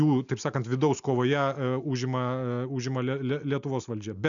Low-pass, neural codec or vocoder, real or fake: 7.2 kHz; none; real